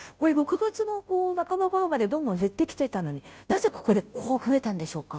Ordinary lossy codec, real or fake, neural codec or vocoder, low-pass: none; fake; codec, 16 kHz, 0.5 kbps, FunCodec, trained on Chinese and English, 25 frames a second; none